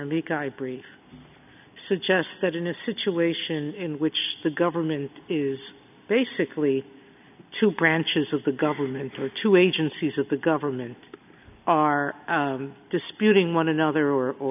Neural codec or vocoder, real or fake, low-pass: none; real; 3.6 kHz